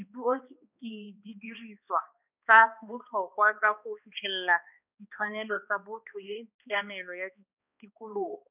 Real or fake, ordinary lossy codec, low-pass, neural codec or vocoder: fake; none; 3.6 kHz; codec, 16 kHz, 2 kbps, X-Codec, HuBERT features, trained on balanced general audio